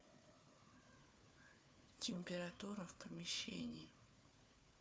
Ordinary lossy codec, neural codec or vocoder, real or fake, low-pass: none; codec, 16 kHz, 4 kbps, FunCodec, trained on Chinese and English, 50 frames a second; fake; none